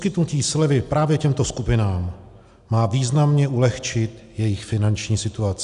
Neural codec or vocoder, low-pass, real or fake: none; 10.8 kHz; real